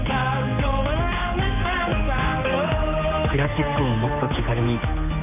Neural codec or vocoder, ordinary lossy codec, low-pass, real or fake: codec, 16 kHz, 4 kbps, X-Codec, HuBERT features, trained on general audio; none; 3.6 kHz; fake